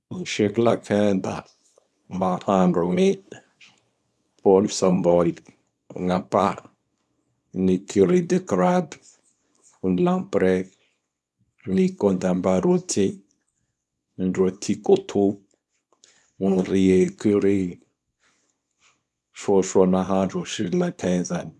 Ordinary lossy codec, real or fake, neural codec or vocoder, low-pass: none; fake; codec, 24 kHz, 0.9 kbps, WavTokenizer, small release; none